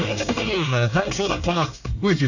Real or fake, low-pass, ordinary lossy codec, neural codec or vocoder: fake; 7.2 kHz; none; codec, 24 kHz, 1 kbps, SNAC